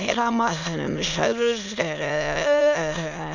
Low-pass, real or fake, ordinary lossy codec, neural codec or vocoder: 7.2 kHz; fake; none; autoencoder, 22.05 kHz, a latent of 192 numbers a frame, VITS, trained on many speakers